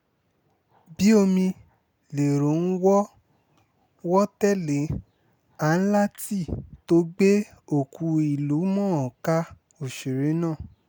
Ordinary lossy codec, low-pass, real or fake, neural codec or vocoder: none; none; real; none